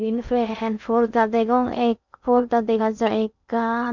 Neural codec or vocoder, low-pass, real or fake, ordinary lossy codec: codec, 16 kHz in and 24 kHz out, 0.6 kbps, FocalCodec, streaming, 2048 codes; 7.2 kHz; fake; none